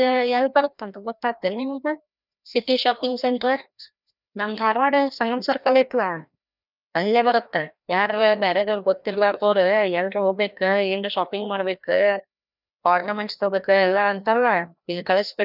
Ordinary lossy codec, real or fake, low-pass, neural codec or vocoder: none; fake; 5.4 kHz; codec, 16 kHz, 1 kbps, FreqCodec, larger model